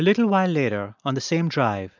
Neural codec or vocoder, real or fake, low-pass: none; real; 7.2 kHz